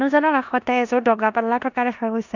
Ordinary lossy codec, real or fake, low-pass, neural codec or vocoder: none; fake; 7.2 kHz; codec, 16 kHz in and 24 kHz out, 0.9 kbps, LongCat-Audio-Codec, four codebook decoder